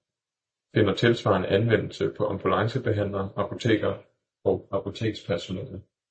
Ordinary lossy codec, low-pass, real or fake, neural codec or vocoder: MP3, 32 kbps; 9.9 kHz; real; none